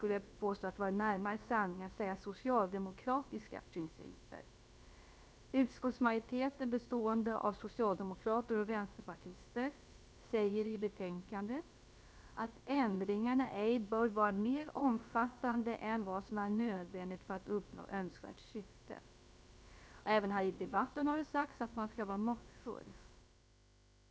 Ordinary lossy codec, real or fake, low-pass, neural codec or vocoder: none; fake; none; codec, 16 kHz, about 1 kbps, DyCAST, with the encoder's durations